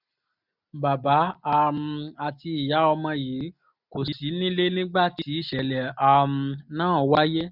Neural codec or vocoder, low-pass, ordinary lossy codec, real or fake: none; 5.4 kHz; none; real